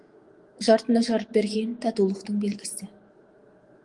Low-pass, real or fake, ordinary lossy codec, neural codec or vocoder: 10.8 kHz; fake; Opus, 24 kbps; autoencoder, 48 kHz, 128 numbers a frame, DAC-VAE, trained on Japanese speech